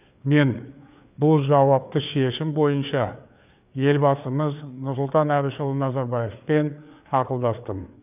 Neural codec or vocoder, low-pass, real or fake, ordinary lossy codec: codec, 16 kHz, 4 kbps, FreqCodec, larger model; 3.6 kHz; fake; none